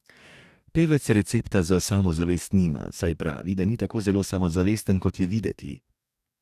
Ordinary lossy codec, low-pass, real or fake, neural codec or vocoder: none; 14.4 kHz; fake; codec, 44.1 kHz, 2.6 kbps, DAC